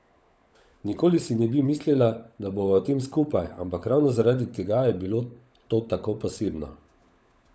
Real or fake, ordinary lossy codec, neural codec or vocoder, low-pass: fake; none; codec, 16 kHz, 16 kbps, FunCodec, trained on LibriTTS, 50 frames a second; none